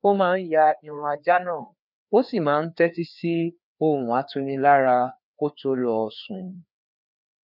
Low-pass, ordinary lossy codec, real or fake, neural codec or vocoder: 5.4 kHz; none; fake; codec, 16 kHz, 2 kbps, FreqCodec, larger model